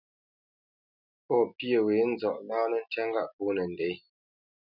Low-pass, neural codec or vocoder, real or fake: 5.4 kHz; none; real